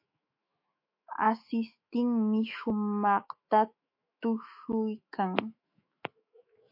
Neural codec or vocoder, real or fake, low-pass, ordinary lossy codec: autoencoder, 48 kHz, 128 numbers a frame, DAC-VAE, trained on Japanese speech; fake; 5.4 kHz; MP3, 32 kbps